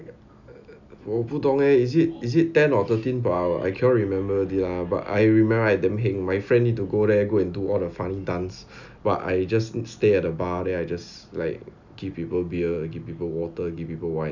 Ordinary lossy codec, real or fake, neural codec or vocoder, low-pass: none; real; none; 7.2 kHz